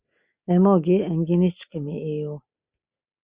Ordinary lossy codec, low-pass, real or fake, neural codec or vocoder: Opus, 64 kbps; 3.6 kHz; real; none